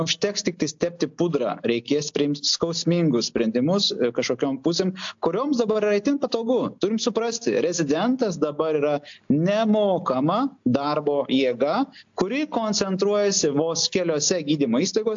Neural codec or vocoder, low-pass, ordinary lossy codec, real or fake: none; 7.2 kHz; AAC, 64 kbps; real